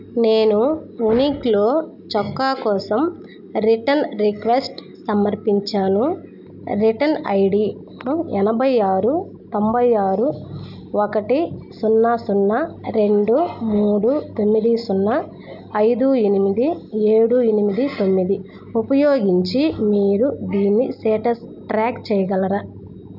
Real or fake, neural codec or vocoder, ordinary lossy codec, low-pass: real; none; none; 5.4 kHz